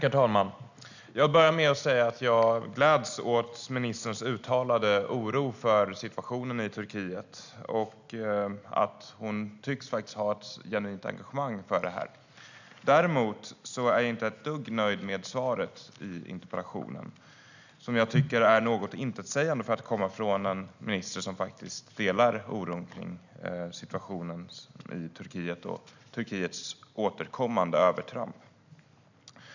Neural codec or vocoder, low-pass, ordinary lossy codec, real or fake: none; 7.2 kHz; none; real